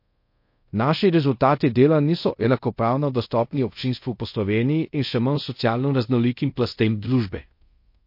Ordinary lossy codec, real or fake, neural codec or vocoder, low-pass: MP3, 32 kbps; fake; codec, 24 kHz, 0.5 kbps, DualCodec; 5.4 kHz